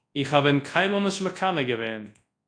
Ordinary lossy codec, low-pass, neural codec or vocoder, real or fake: AAC, 48 kbps; 9.9 kHz; codec, 24 kHz, 0.9 kbps, WavTokenizer, large speech release; fake